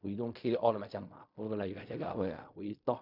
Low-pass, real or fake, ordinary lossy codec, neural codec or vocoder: 5.4 kHz; fake; none; codec, 16 kHz in and 24 kHz out, 0.4 kbps, LongCat-Audio-Codec, fine tuned four codebook decoder